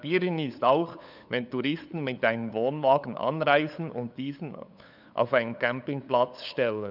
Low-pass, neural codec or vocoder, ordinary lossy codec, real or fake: 5.4 kHz; codec, 16 kHz, 8 kbps, FunCodec, trained on LibriTTS, 25 frames a second; none; fake